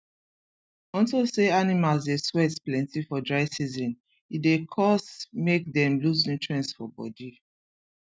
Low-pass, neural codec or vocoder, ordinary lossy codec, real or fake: none; none; none; real